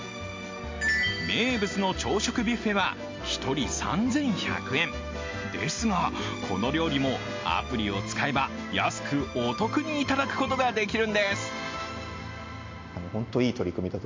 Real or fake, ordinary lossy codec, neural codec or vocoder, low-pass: real; MP3, 48 kbps; none; 7.2 kHz